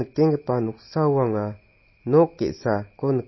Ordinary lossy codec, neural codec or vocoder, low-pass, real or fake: MP3, 24 kbps; none; 7.2 kHz; real